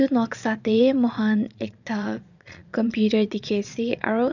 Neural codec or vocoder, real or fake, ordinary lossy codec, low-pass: vocoder, 22.05 kHz, 80 mel bands, Vocos; fake; none; 7.2 kHz